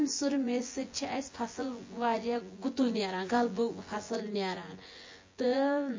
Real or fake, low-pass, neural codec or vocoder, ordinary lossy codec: fake; 7.2 kHz; vocoder, 24 kHz, 100 mel bands, Vocos; MP3, 32 kbps